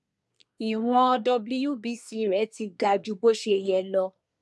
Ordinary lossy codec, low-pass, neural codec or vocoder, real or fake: none; none; codec, 24 kHz, 1 kbps, SNAC; fake